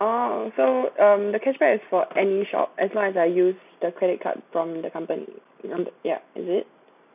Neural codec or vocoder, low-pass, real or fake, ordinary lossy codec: none; 3.6 kHz; real; none